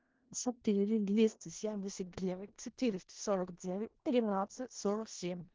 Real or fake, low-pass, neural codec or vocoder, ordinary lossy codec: fake; 7.2 kHz; codec, 16 kHz in and 24 kHz out, 0.4 kbps, LongCat-Audio-Codec, four codebook decoder; Opus, 16 kbps